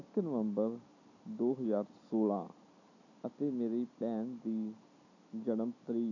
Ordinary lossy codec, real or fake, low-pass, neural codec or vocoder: none; real; 7.2 kHz; none